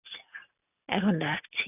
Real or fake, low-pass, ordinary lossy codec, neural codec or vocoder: real; 3.6 kHz; none; none